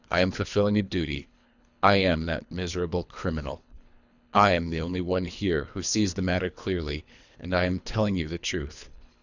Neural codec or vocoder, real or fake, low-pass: codec, 24 kHz, 3 kbps, HILCodec; fake; 7.2 kHz